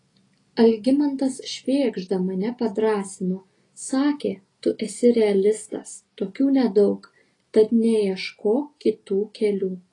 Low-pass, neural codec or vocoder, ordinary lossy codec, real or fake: 10.8 kHz; none; AAC, 32 kbps; real